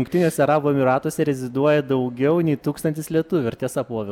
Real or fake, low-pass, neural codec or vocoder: real; 19.8 kHz; none